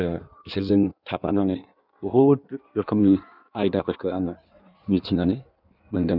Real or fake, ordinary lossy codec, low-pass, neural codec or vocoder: fake; none; 5.4 kHz; codec, 16 kHz in and 24 kHz out, 1.1 kbps, FireRedTTS-2 codec